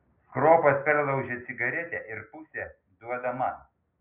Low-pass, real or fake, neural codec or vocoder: 3.6 kHz; real; none